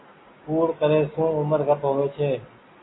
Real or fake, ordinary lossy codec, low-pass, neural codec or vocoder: real; AAC, 16 kbps; 7.2 kHz; none